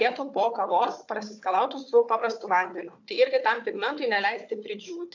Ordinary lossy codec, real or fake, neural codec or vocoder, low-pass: AAC, 48 kbps; fake; codec, 16 kHz, 4 kbps, FunCodec, trained on Chinese and English, 50 frames a second; 7.2 kHz